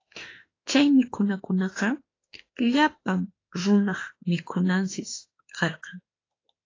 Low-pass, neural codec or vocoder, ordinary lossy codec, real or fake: 7.2 kHz; autoencoder, 48 kHz, 32 numbers a frame, DAC-VAE, trained on Japanese speech; AAC, 32 kbps; fake